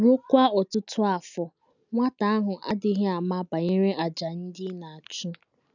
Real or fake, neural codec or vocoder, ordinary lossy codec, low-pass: real; none; none; 7.2 kHz